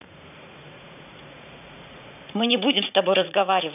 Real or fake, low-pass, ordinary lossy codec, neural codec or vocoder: fake; 3.6 kHz; none; vocoder, 44.1 kHz, 80 mel bands, Vocos